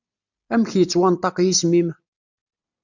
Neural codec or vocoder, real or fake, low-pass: none; real; 7.2 kHz